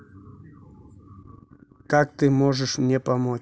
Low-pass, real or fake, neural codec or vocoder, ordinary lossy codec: none; real; none; none